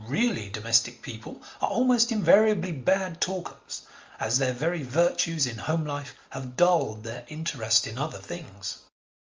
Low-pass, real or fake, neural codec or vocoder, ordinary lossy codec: 7.2 kHz; real; none; Opus, 32 kbps